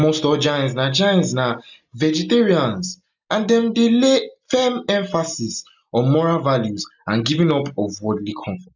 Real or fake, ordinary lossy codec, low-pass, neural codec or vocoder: real; none; 7.2 kHz; none